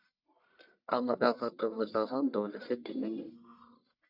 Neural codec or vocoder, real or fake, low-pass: codec, 44.1 kHz, 1.7 kbps, Pupu-Codec; fake; 5.4 kHz